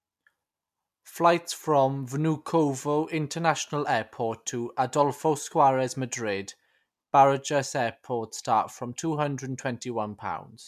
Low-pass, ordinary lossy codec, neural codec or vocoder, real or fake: 14.4 kHz; MP3, 96 kbps; none; real